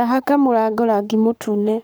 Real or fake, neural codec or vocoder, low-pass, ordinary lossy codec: fake; codec, 44.1 kHz, 7.8 kbps, Pupu-Codec; none; none